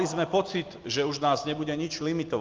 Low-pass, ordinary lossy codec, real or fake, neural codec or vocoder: 7.2 kHz; Opus, 32 kbps; real; none